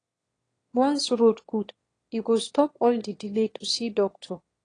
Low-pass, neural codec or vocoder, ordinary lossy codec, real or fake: 9.9 kHz; autoencoder, 22.05 kHz, a latent of 192 numbers a frame, VITS, trained on one speaker; AAC, 32 kbps; fake